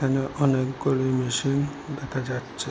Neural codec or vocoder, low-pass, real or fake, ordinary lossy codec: none; none; real; none